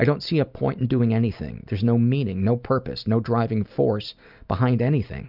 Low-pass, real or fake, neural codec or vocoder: 5.4 kHz; real; none